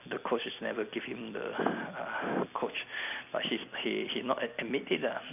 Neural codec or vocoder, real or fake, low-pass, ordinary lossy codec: none; real; 3.6 kHz; none